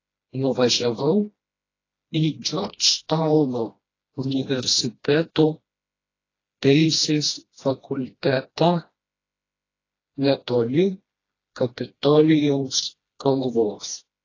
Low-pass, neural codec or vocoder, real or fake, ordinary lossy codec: 7.2 kHz; codec, 16 kHz, 1 kbps, FreqCodec, smaller model; fake; AAC, 32 kbps